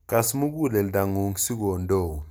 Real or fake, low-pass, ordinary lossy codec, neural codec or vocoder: real; none; none; none